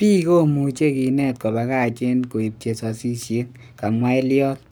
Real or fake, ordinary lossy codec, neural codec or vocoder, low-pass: fake; none; codec, 44.1 kHz, 7.8 kbps, Pupu-Codec; none